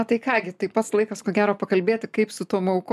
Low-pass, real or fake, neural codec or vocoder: 14.4 kHz; real; none